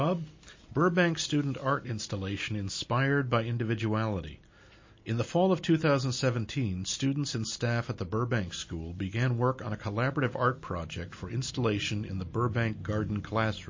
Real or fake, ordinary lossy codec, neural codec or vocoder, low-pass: real; MP3, 32 kbps; none; 7.2 kHz